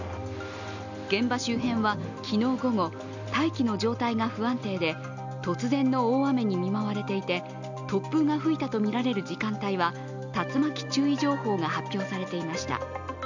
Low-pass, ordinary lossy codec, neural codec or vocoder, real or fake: 7.2 kHz; none; none; real